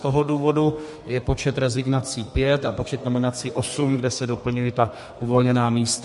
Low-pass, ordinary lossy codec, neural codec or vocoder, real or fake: 14.4 kHz; MP3, 48 kbps; codec, 32 kHz, 1.9 kbps, SNAC; fake